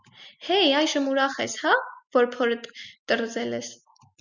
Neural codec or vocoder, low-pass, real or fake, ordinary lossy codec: none; 7.2 kHz; real; Opus, 64 kbps